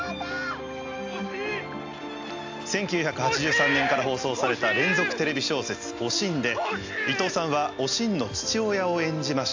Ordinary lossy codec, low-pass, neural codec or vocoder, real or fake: AAC, 48 kbps; 7.2 kHz; none; real